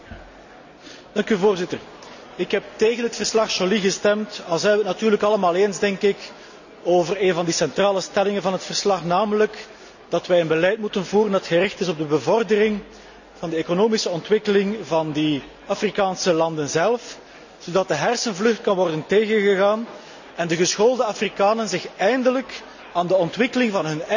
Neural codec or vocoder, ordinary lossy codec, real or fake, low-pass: none; none; real; 7.2 kHz